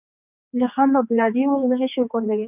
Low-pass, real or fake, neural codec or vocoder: 3.6 kHz; fake; codec, 16 kHz, 4 kbps, X-Codec, HuBERT features, trained on general audio